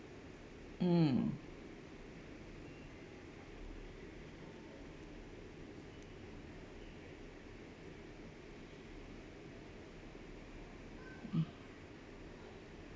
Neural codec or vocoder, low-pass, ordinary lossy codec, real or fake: none; none; none; real